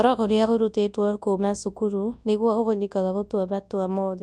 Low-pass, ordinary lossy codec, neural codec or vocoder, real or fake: none; none; codec, 24 kHz, 0.9 kbps, WavTokenizer, large speech release; fake